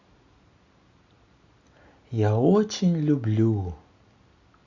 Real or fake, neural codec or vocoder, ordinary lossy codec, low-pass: real; none; Opus, 64 kbps; 7.2 kHz